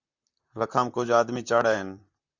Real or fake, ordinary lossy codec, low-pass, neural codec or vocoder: fake; Opus, 64 kbps; 7.2 kHz; vocoder, 44.1 kHz, 128 mel bands, Pupu-Vocoder